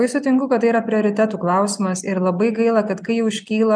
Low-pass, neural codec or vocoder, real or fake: 9.9 kHz; none; real